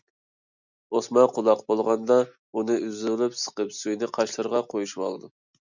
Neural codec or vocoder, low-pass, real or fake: none; 7.2 kHz; real